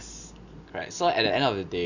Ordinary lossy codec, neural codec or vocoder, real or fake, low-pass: none; none; real; 7.2 kHz